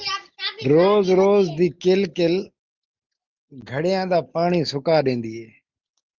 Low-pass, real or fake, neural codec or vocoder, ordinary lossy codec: 7.2 kHz; real; none; Opus, 32 kbps